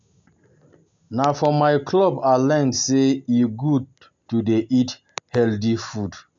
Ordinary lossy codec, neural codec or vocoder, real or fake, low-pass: AAC, 64 kbps; none; real; 7.2 kHz